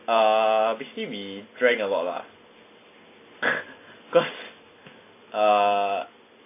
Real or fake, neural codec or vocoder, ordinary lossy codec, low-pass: real; none; AAC, 24 kbps; 3.6 kHz